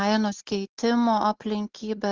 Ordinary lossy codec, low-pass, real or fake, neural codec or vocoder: Opus, 32 kbps; 7.2 kHz; real; none